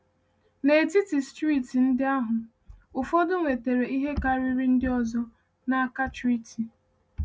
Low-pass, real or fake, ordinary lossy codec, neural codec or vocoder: none; real; none; none